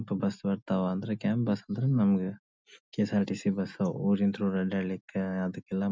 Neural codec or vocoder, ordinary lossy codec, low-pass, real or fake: none; none; none; real